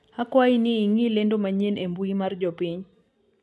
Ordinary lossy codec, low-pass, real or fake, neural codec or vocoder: none; none; real; none